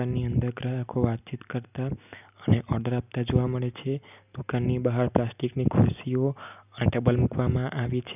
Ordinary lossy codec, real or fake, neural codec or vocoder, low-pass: none; real; none; 3.6 kHz